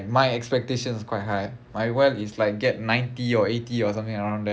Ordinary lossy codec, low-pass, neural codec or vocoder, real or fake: none; none; none; real